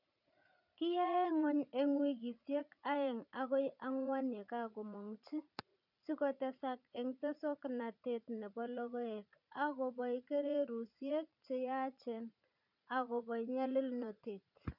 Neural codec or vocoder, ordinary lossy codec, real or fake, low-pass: vocoder, 44.1 kHz, 80 mel bands, Vocos; none; fake; 5.4 kHz